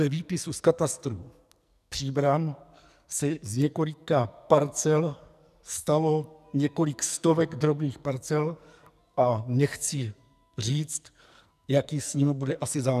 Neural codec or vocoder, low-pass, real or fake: codec, 44.1 kHz, 2.6 kbps, SNAC; 14.4 kHz; fake